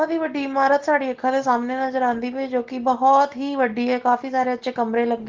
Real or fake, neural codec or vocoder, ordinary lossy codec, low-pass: real; none; Opus, 16 kbps; 7.2 kHz